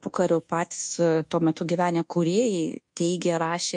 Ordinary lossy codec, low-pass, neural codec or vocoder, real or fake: MP3, 48 kbps; 10.8 kHz; codec, 24 kHz, 1.2 kbps, DualCodec; fake